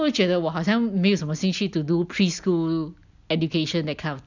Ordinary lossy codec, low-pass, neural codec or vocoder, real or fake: none; 7.2 kHz; none; real